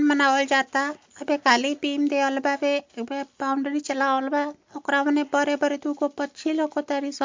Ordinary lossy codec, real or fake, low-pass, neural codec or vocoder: MP3, 48 kbps; real; 7.2 kHz; none